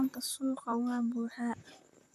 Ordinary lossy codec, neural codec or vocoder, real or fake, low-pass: AAC, 96 kbps; vocoder, 44.1 kHz, 128 mel bands, Pupu-Vocoder; fake; 14.4 kHz